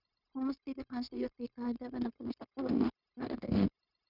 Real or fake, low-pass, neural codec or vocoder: fake; 5.4 kHz; codec, 16 kHz, 0.9 kbps, LongCat-Audio-Codec